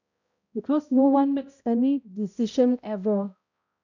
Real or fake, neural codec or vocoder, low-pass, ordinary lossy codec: fake; codec, 16 kHz, 0.5 kbps, X-Codec, HuBERT features, trained on balanced general audio; 7.2 kHz; none